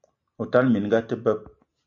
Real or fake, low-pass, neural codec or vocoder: real; 7.2 kHz; none